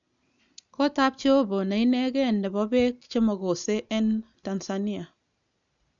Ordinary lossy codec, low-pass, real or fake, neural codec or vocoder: none; 7.2 kHz; real; none